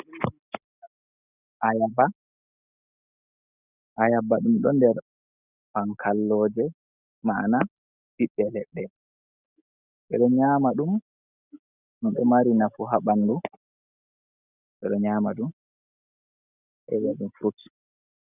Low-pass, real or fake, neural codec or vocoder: 3.6 kHz; real; none